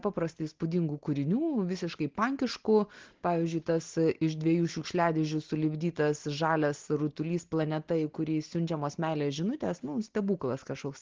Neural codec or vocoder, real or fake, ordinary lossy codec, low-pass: none; real; Opus, 16 kbps; 7.2 kHz